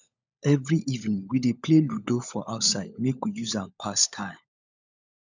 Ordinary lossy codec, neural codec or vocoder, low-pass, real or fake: none; codec, 16 kHz, 16 kbps, FunCodec, trained on LibriTTS, 50 frames a second; 7.2 kHz; fake